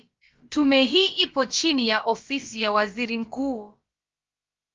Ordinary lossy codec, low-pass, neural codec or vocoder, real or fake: Opus, 32 kbps; 7.2 kHz; codec, 16 kHz, about 1 kbps, DyCAST, with the encoder's durations; fake